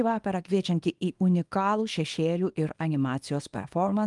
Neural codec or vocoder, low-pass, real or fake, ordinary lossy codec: codec, 24 kHz, 0.9 kbps, WavTokenizer, small release; 10.8 kHz; fake; Opus, 32 kbps